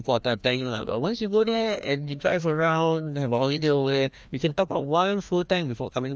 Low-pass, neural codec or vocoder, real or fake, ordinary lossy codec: none; codec, 16 kHz, 1 kbps, FreqCodec, larger model; fake; none